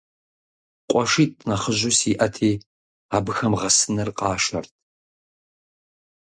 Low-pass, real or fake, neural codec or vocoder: 9.9 kHz; real; none